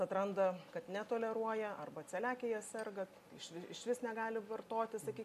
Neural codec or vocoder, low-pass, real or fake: none; 14.4 kHz; real